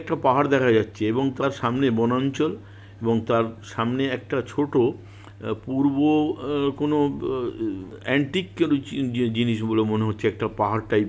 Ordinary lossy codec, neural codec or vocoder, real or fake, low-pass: none; none; real; none